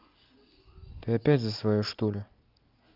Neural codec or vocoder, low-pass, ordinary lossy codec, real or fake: none; 5.4 kHz; Opus, 24 kbps; real